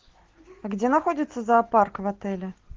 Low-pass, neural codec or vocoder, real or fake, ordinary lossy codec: 7.2 kHz; none; real; Opus, 16 kbps